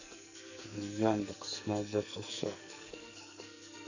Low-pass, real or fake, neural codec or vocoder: 7.2 kHz; fake; codec, 44.1 kHz, 2.6 kbps, SNAC